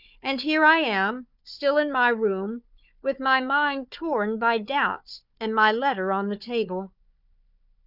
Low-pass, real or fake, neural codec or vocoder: 5.4 kHz; fake; codec, 16 kHz, 4 kbps, FunCodec, trained on Chinese and English, 50 frames a second